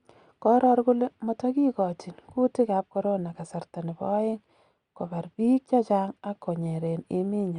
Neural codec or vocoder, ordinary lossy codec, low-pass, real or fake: none; Opus, 64 kbps; 9.9 kHz; real